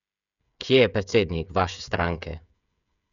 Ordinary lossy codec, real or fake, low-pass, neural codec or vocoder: none; fake; 7.2 kHz; codec, 16 kHz, 16 kbps, FreqCodec, smaller model